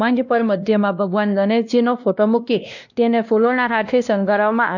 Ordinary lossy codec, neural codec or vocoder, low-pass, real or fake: none; codec, 16 kHz, 1 kbps, X-Codec, WavLM features, trained on Multilingual LibriSpeech; 7.2 kHz; fake